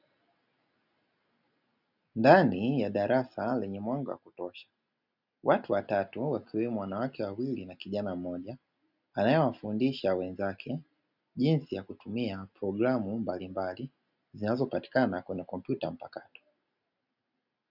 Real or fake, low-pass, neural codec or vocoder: real; 5.4 kHz; none